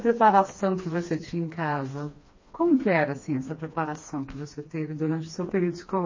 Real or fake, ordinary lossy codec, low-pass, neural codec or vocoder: fake; MP3, 32 kbps; 7.2 kHz; codec, 16 kHz, 2 kbps, FreqCodec, smaller model